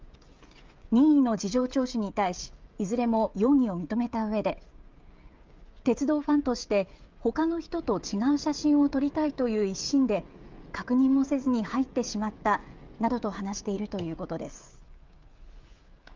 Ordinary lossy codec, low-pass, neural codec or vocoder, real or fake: Opus, 24 kbps; 7.2 kHz; codec, 16 kHz, 16 kbps, FreqCodec, smaller model; fake